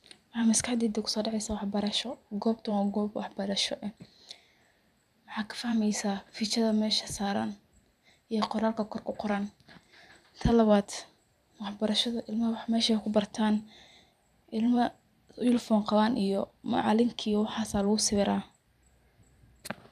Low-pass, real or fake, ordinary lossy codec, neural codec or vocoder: 14.4 kHz; fake; none; vocoder, 44.1 kHz, 128 mel bands every 512 samples, BigVGAN v2